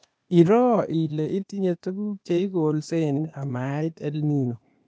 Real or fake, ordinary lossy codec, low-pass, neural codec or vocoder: fake; none; none; codec, 16 kHz, 0.8 kbps, ZipCodec